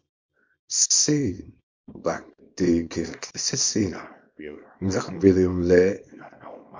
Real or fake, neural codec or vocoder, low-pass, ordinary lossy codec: fake; codec, 24 kHz, 0.9 kbps, WavTokenizer, small release; 7.2 kHz; MP3, 48 kbps